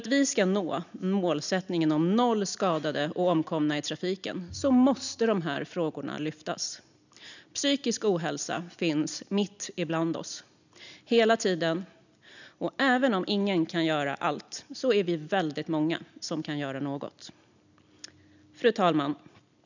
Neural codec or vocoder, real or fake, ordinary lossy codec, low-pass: none; real; none; 7.2 kHz